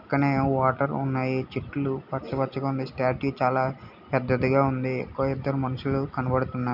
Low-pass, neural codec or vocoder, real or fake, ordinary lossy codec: 5.4 kHz; none; real; none